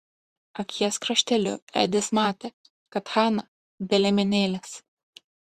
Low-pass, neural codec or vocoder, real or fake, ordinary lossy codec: 14.4 kHz; vocoder, 44.1 kHz, 128 mel bands, Pupu-Vocoder; fake; Opus, 64 kbps